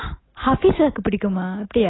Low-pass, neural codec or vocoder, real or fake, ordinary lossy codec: 7.2 kHz; none; real; AAC, 16 kbps